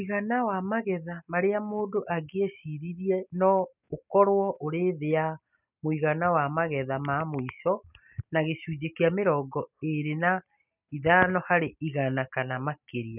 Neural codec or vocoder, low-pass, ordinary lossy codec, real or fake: none; 3.6 kHz; none; real